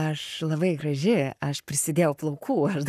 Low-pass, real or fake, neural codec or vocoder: 14.4 kHz; fake; codec, 44.1 kHz, 7.8 kbps, Pupu-Codec